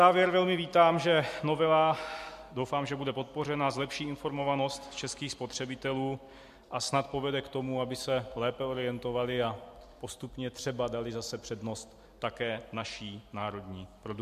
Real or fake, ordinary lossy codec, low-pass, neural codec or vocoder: real; MP3, 64 kbps; 14.4 kHz; none